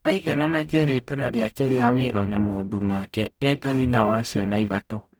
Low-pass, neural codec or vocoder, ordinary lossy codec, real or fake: none; codec, 44.1 kHz, 0.9 kbps, DAC; none; fake